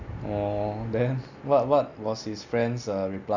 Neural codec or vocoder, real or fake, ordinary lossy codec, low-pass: none; real; none; 7.2 kHz